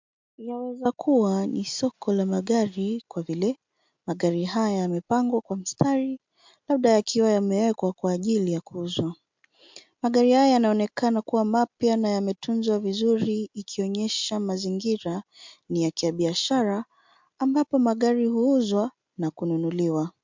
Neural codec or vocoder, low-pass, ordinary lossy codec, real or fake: none; 7.2 kHz; MP3, 64 kbps; real